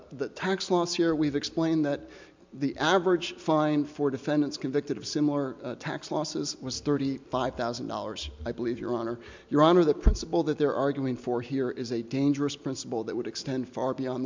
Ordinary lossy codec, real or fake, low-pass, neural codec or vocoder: MP3, 64 kbps; real; 7.2 kHz; none